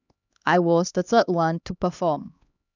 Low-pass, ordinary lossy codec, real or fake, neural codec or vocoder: 7.2 kHz; none; fake; codec, 16 kHz, 2 kbps, X-Codec, HuBERT features, trained on LibriSpeech